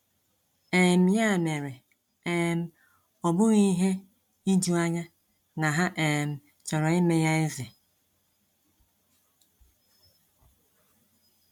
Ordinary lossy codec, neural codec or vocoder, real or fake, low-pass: MP3, 96 kbps; none; real; 19.8 kHz